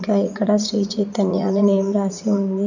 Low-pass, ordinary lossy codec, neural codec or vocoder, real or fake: 7.2 kHz; none; vocoder, 44.1 kHz, 80 mel bands, Vocos; fake